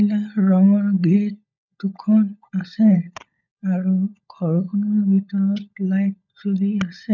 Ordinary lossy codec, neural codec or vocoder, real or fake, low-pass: none; codec, 16 kHz, 16 kbps, FunCodec, trained on LibriTTS, 50 frames a second; fake; 7.2 kHz